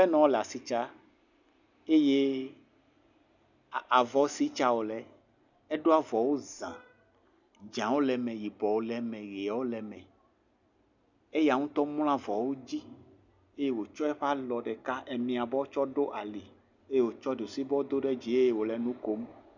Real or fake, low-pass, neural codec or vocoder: real; 7.2 kHz; none